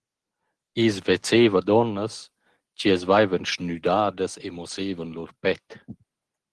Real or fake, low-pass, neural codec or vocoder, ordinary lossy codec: real; 10.8 kHz; none; Opus, 16 kbps